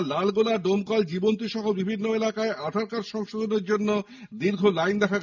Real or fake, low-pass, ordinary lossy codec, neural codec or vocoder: real; 7.2 kHz; none; none